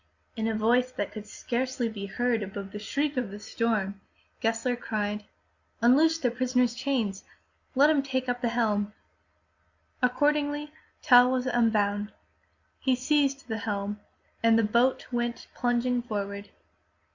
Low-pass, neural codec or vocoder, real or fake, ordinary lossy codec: 7.2 kHz; none; real; Opus, 64 kbps